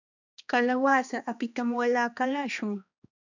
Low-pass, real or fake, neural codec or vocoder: 7.2 kHz; fake; codec, 16 kHz, 2 kbps, X-Codec, HuBERT features, trained on balanced general audio